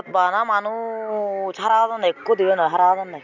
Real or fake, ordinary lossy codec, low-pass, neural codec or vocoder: real; none; 7.2 kHz; none